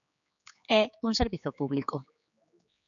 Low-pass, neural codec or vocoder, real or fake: 7.2 kHz; codec, 16 kHz, 4 kbps, X-Codec, HuBERT features, trained on general audio; fake